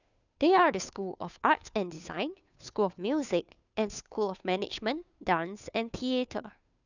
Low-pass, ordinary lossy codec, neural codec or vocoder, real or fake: 7.2 kHz; none; codec, 16 kHz, 2 kbps, FunCodec, trained on Chinese and English, 25 frames a second; fake